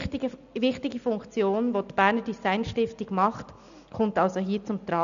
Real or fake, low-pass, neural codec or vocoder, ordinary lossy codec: real; 7.2 kHz; none; none